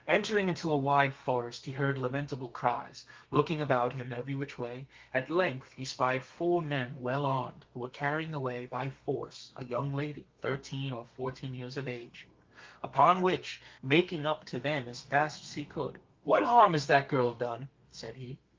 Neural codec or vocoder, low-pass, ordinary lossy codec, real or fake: codec, 32 kHz, 1.9 kbps, SNAC; 7.2 kHz; Opus, 32 kbps; fake